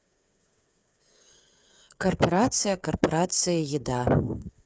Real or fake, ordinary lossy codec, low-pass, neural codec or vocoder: fake; none; none; codec, 16 kHz, 8 kbps, FreqCodec, smaller model